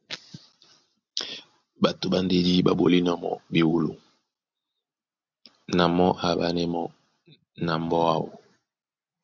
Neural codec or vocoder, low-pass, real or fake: none; 7.2 kHz; real